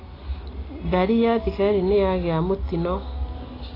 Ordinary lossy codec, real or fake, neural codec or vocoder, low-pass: AAC, 24 kbps; real; none; 5.4 kHz